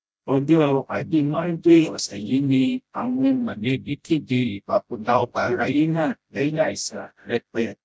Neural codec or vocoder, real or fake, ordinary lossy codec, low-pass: codec, 16 kHz, 0.5 kbps, FreqCodec, smaller model; fake; none; none